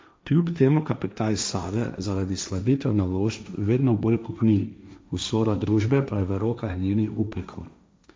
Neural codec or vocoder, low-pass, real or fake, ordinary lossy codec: codec, 16 kHz, 1.1 kbps, Voila-Tokenizer; none; fake; none